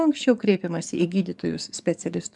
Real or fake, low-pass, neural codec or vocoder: fake; 10.8 kHz; codec, 44.1 kHz, 7.8 kbps, DAC